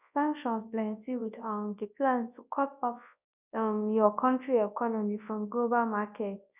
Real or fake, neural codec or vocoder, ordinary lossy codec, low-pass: fake; codec, 24 kHz, 0.9 kbps, WavTokenizer, large speech release; none; 3.6 kHz